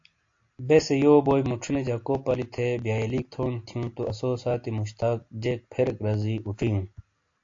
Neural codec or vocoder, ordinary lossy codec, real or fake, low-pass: none; AAC, 48 kbps; real; 7.2 kHz